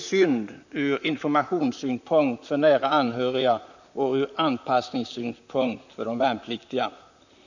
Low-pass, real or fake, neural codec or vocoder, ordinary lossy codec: 7.2 kHz; fake; vocoder, 44.1 kHz, 128 mel bands, Pupu-Vocoder; none